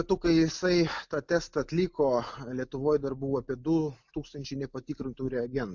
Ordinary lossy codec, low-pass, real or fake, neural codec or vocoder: MP3, 64 kbps; 7.2 kHz; real; none